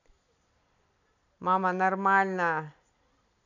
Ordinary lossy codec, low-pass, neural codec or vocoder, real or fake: none; 7.2 kHz; none; real